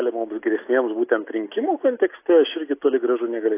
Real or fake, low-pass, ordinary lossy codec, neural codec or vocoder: real; 3.6 kHz; AAC, 24 kbps; none